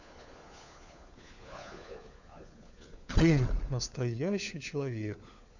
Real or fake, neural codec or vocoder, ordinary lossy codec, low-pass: fake; codec, 16 kHz, 4 kbps, FunCodec, trained on LibriTTS, 50 frames a second; none; 7.2 kHz